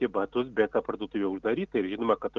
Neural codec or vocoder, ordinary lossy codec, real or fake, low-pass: none; Opus, 24 kbps; real; 7.2 kHz